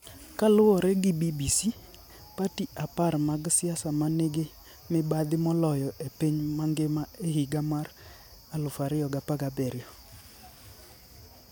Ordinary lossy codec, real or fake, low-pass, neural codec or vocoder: none; real; none; none